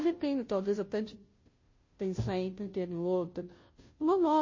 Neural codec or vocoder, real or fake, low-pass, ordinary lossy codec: codec, 16 kHz, 0.5 kbps, FunCodec, trained on Chinese and English, 25 frames a second; fake; 7.2 kHz; MP3, 32 kbps